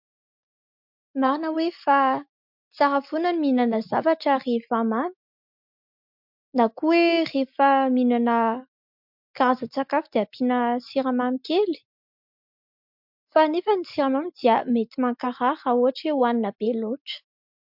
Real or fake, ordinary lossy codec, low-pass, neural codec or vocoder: real; MP3, 48 kbps; 5.4 kHz; none